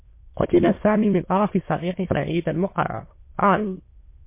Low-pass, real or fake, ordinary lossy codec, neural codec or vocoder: 3.6 kHz; fake; MP3, 24 kbps; autoencoder, 22.05 kHz, a latent of 192 numbers a frame, VITS, trained on many speakers